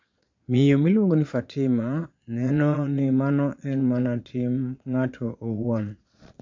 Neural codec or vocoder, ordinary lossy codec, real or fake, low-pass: vocoder, 22.05 kHz, 80 mel bands, Vocos; MP3, 48 kbps; fake; 7.2 kHz